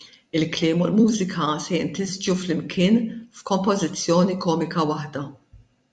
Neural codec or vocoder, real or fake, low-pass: vocoder, 44.1 kHz, 128 mel bands every 256 samples, BigVGAN v2; fake; 10.8 kHz